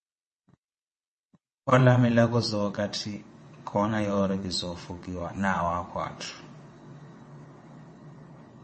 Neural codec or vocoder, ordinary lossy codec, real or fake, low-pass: vocoder, 22.05 kHz, 80 mel bands, WaveNeXt; MP3, 32 kbps; fake; 9.9 kHz